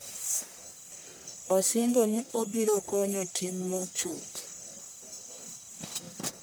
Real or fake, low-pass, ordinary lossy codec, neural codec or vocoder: fake; none; none; codec, 44.1 kHz, 1.7 kbps, Pupu-Codec